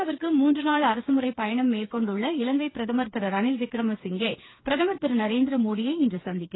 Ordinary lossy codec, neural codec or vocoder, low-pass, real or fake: AAC, 16 kbps; codec, 16 kHz, 4 kbps, FreqCodec, smaller model; 7.2 kHz; fake